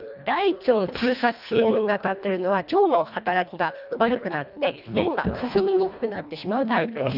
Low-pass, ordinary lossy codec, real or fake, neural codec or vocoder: 5.4 kHz; none; fake; codec, 24 kHz, 1.5 kbps, HILCodec